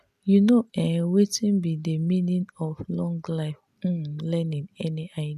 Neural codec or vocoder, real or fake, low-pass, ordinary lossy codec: none; real; 14.4 kHz; none